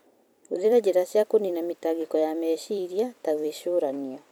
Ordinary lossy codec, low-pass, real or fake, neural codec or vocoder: none; none; fake; vocoder, 44.1 kHz, 128 mel bands every 256 samples, BigVGAN v2